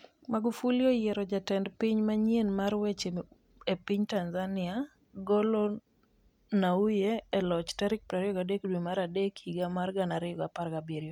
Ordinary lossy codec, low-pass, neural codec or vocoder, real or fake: none; 19.8 kHz; none; real